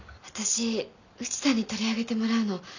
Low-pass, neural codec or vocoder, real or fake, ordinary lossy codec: 7.2 kHz; none; real; none